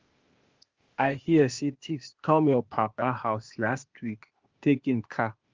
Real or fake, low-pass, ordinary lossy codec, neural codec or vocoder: fake; 7.2 kHz; Opus, 32 kbps; codec, 16 kHz, 0.8 kbps, ZipCodec